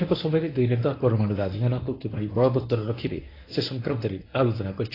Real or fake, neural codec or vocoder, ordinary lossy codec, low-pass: fake; codec, 24 kHz, 0.9 kbps, WavTokenizer, medium speech release version 1; AAC, 24 kbps; 5.4 kHz